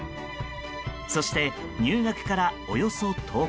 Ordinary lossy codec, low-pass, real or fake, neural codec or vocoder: none; none; real; none